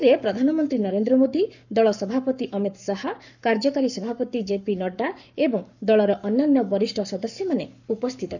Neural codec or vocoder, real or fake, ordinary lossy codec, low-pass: codec, 44.1 kHz, 7.8 kbps, Pupu-Codec; fake; none; 7.2 kHz